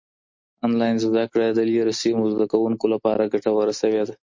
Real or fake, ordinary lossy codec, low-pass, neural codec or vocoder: real; MP3, 48 kbps; 7.2 kHz; none